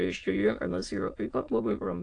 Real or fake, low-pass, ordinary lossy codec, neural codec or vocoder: fake; 9.9 kHz; AAC, 48 kbps; autoencoder, 22.05 kHz, a latent of 192 numbers a frame, VITS, trained on many speakers